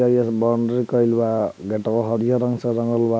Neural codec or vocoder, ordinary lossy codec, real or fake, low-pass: none; none; real; none